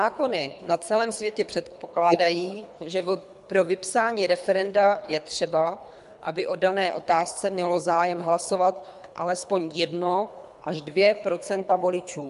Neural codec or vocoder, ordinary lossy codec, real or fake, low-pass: codec, 24 kHz, 3 kbps, HILCodec; AAC, 96 kbps; fake; 10.8 kHz